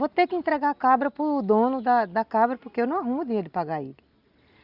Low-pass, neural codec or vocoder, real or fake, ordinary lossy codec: 5.4 kHz; none; real; Opus, 64 kbps